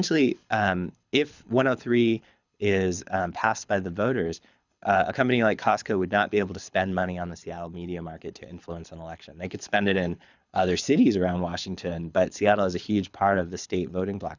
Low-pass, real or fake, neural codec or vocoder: 7.2 kHz; fake; codec, 24 kHz, 6 kbps, HILCodec